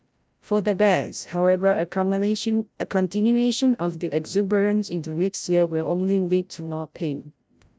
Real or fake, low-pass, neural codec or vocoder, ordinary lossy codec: fake; none; codec, 16 kHz, 0.5 kbps, FreqCodec, larger model; none